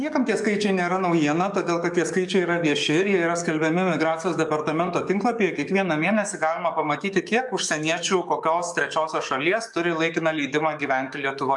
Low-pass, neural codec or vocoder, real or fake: 10.8 kHz; codec, 44.1 kHz, 7.8 kbps, DAC; fake